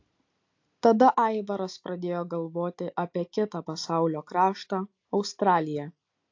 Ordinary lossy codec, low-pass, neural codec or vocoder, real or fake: AAC, 48 kbps; 7.2 kHz; none; real